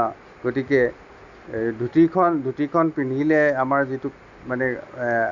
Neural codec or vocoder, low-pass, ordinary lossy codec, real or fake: none; 7.2 kHz; none; real